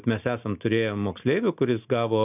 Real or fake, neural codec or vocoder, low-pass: real; none; 3.6 kHz